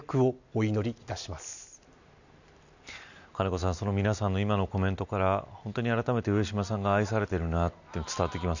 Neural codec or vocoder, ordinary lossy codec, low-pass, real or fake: none; none; 7.2 kHz; real